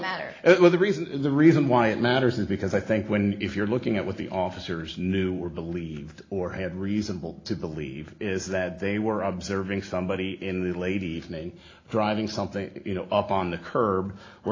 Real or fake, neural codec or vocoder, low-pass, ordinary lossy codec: real; none; 7.2 kHz; AAC, 32 kbps